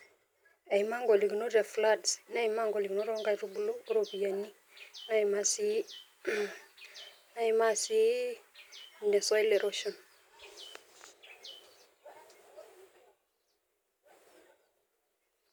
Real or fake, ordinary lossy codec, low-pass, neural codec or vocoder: real; none; none; none